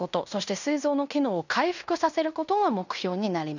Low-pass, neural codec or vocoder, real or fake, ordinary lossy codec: 7.2 kHz; codec, 16 kHz in and 24 kHz out, 0.9 kbps, LongCat-Audio-Codec, fine tuned four codebook decoder; fake; none